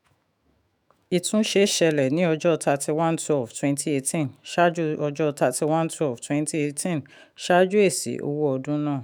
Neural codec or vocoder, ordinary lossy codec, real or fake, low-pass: autoencoder, 48 kHz, 128 numbers a frame, DAC-VAE, trained on Japanese speech; none; fake; none